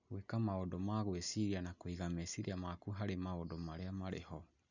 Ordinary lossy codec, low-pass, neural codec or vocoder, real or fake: none; 7.2 kHz; none; real